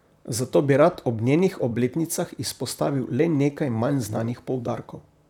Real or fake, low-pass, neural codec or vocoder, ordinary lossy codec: fake; 19.8 kHz; vocoder, 44.1 kHz, 128 mel bands, Pupu-Vocoder; none